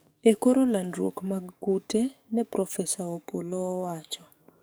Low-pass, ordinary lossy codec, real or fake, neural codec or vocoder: none; none; fake; codec, 44.1 kHz, 7.8 kbps, DAC